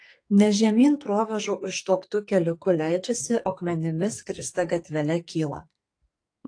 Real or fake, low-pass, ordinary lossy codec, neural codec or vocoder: fake; 9.9 kHz; AAC, 48 kbps; codec, 44.1 kHz, 2.6 kbps, SNAC